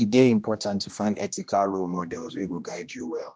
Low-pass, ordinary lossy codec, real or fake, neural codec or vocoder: none; none; fake; codec, 16 kHz, 1 kbps, X-Codec, HuBERT features, trained on general audio